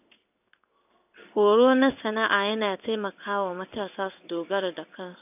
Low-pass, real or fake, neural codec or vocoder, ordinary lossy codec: 3.6 kHz; fake; codec, 16 kHz in and 24 kHz out, 1 kbps, XY-Tokenizer; none